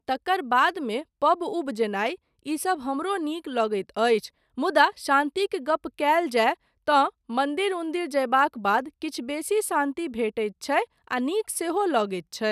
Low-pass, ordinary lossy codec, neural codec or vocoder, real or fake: 14.4 kHz; none; none; real